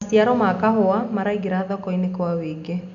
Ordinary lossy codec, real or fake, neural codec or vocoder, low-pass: none; real; none; 7.2 kHz